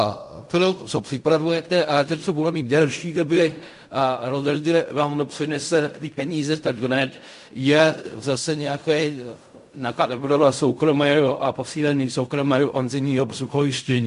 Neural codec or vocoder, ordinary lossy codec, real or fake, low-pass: codec, 16 kHz in and 24 kHz out, 0.4 kbps, LongCat-Audio-Codec, fine tuned four codebook decoder; MP3, 64 kbps; fake; 10.8 kHz